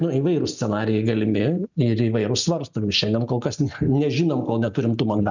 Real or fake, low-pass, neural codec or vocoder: real; 7.2 kHz; none